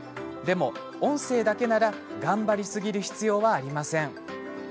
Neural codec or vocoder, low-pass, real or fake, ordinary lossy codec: none; none; real; none